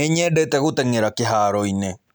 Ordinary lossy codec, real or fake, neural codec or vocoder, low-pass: none; real; none; none